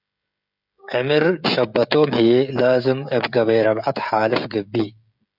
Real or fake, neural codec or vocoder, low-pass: fake; codec, 16 kHz, 16 kbps, FreqCodec, smaller model; 5.4 kHz